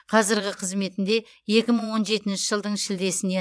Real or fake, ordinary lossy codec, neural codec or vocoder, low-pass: fake; none; vocoder, 22.05 kHz, 80 mel bands, Vocos; none